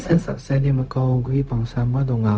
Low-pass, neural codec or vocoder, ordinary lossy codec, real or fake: none; codec, 16 kHz, 0.4 kbps, LongCat-Audio-Codec; none; fake